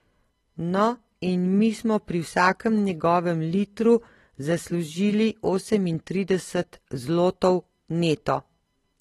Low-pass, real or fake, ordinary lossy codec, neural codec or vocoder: 19.8 kHz; real; AAC, 32 kbps; none